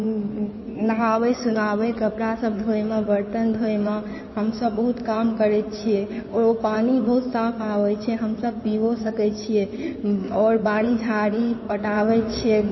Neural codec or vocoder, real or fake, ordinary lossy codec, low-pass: codec, 16 kHz in and 24 kHz out, 2.2 kbps, FireRedTTS-2 codec; fake; MP3, 24 kbps; 7.2 kHz